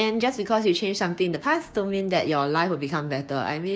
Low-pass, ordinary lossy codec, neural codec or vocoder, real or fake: none; none; codec, 16 kHz, 6 kbps, DAC; fake